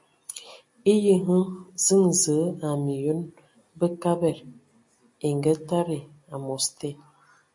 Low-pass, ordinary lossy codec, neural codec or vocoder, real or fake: 10.8 kHz; MP3, 48 kbps; none; real